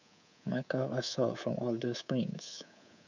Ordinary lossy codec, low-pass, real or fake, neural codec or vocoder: none; 7.2 kHz; fake; codec, 24 kHz, 3.1 kbps, DualCodec